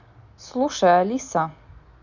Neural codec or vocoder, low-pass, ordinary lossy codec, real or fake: none; 7.2 kHz; none; real